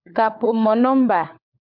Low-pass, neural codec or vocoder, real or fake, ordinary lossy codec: 5.4 kHz; codec, 16 kHz, 16 kbps, FunCodec, trained on LibriTTS, 50 frames a second; fake; MP3, 48 kbps